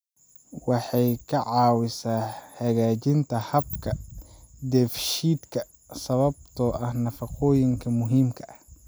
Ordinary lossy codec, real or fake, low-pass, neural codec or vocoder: none; real; none; none